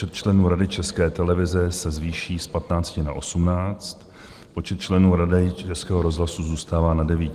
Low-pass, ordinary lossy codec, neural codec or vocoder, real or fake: 14.4 kHz; Opus, 24 kbps; none; real